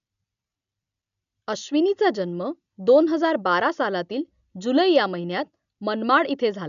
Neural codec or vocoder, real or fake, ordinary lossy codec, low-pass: none; real; none; 7.2 kHz